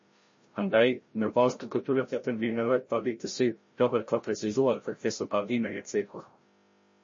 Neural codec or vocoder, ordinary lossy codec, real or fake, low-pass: codec, 16 kHz, 0.5 kbps, FreqCodec, larger model; MP3, 32 kbps; fake; 7.2 kHz